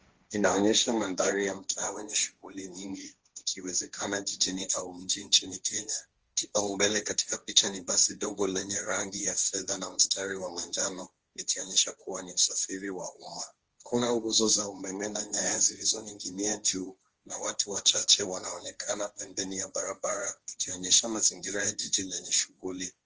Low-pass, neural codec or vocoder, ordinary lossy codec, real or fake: 7.2 kHz; codec, 16 kHz, 1.1 kbps, Voila-Tokenizer; Opus, 24 kbps; fake